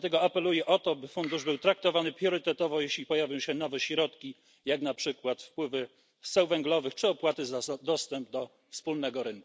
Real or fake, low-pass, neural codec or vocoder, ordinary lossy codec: real; none; none; none